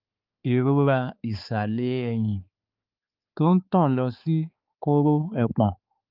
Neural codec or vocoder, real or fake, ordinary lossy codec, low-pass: codec, 16 kHz, 4 kbps, X-Codec, HuBERT features, trained on balanced general audio; fake; Opus, 24 kbps; 5.4 kHz